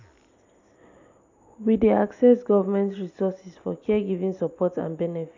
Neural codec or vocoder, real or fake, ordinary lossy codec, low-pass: none; real; AAC, 48 kbps; 7.2 kHz